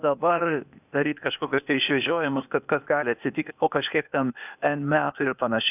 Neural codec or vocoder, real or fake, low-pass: codec, 16 kHz, 0.8 kbps, ZipCodec; fake; 3.6 kHz